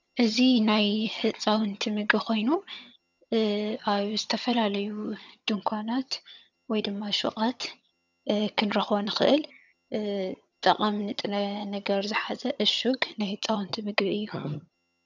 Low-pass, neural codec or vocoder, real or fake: 7.2 kHz; vocoder, 22.05 kHz, 80 mel bands, HiFi-GAN; fake